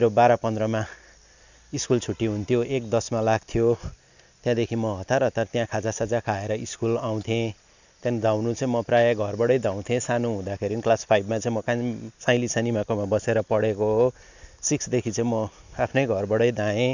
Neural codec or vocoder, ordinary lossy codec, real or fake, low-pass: none; none; real; 7.2 kHz